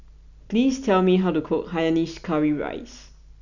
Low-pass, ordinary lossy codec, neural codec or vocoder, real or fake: 7.2 kHz; none; none; real